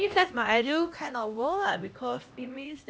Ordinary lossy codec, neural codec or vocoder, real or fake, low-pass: none; codec, 16 kHz, 1 kbps, X-Codec, HuBERT features, trained on LibriSpeech; fake; none